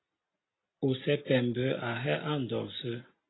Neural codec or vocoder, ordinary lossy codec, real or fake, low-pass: none; AAC, 16 kbps; real; 7.2 kHz